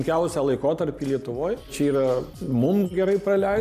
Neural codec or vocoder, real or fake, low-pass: none; real; 14.4 kHz